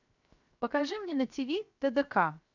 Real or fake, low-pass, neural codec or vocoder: fake; 7.2 kHz; codec, 16 kHz, 0.7 kbps, FocalCodec